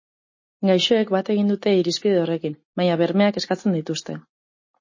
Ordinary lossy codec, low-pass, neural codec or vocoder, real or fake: MP3, 32 kbps; 7.2 kHz; none; real